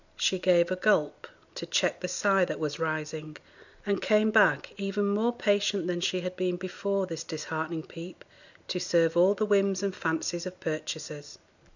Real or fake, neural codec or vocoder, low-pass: real; none; 7.2 kHz